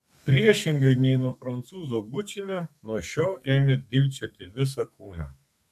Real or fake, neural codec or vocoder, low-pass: fake; codec, 32 kHz, 1.9 kbps, SNAC; 14.4 kHz